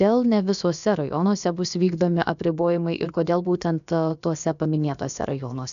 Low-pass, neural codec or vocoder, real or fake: 7.2 kHz; codec, 16 kHz, about 1 kbps, DyCAST, with the encoder's durations; fake